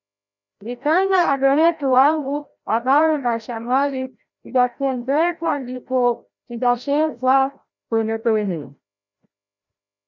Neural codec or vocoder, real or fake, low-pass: codec, 16 kHz, 0.5 kbps, FreqCodec, larger model; fake; 7.2 kHz